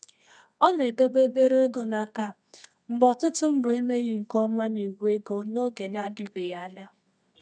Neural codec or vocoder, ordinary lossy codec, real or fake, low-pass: codec, 24 kHz, 0.9 kbps, WavTokenizer, medium music audio release; none; fake; 9.9 kHz